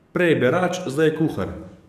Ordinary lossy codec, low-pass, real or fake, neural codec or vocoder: none; 14.4 kHz; fake; codec, 44.1 kHz, 7.8 kbps, DAC